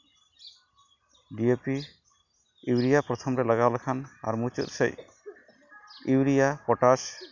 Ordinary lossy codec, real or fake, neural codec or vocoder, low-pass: none; real; none; 7.2 kHz